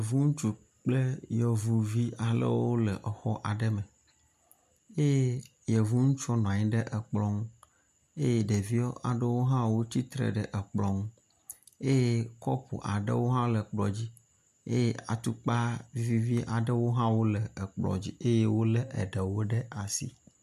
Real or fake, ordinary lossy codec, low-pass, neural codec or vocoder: real; MP3, 96 kbps; 14.4 kHz; none